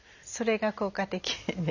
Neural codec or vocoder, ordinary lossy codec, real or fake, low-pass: none; none; real; 7.2 kHz